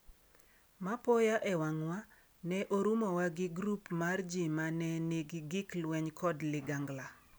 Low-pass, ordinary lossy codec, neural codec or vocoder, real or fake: none; none; none; real